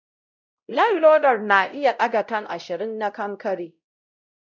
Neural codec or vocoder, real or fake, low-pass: codec, 16 kHz, 0.5 kbps, X-Codec, WavLM features, trained on Multilingual LibriSpeech; fake; 7.2 kHz